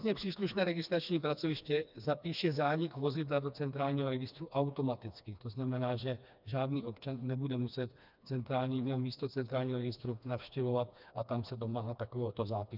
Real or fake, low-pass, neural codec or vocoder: fake; 5.4 kHz; codec, 16 kHz, 2 kbps, FreqCodec, smaller model